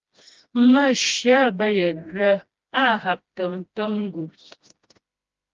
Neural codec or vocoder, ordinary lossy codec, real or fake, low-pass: codec, 16 kHz, 1 kbps, FreqCodec, smaller model; Opus, 32 kbps; fake; 7.2 kHz